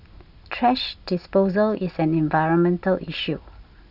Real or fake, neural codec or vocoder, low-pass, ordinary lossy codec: real; none; 5.4 kHz; none